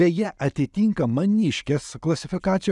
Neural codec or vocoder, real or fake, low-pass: none; real; 10.8 kHz